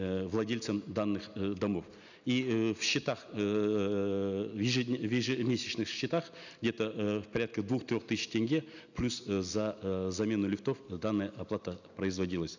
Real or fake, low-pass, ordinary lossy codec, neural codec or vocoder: real; 7.2 kHz; none; none